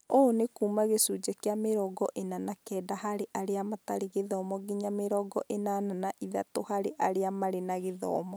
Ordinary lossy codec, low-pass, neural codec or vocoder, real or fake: none; none; none; real